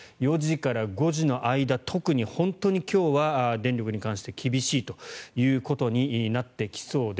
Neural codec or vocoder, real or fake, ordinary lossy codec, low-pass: none; real; none; none